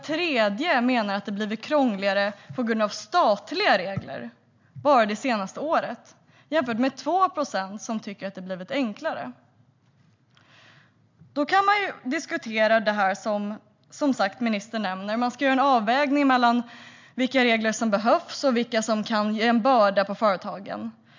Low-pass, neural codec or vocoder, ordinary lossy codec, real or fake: 7.2 kHz; none; MP3, 64 kbps; real